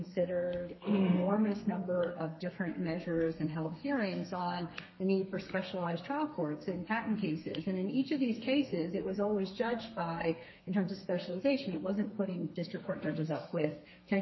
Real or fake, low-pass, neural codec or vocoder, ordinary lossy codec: fake; 7.2 kHz; codec, 32 kHz, 1.9 kbps, SNAC; MP3, 24 kbps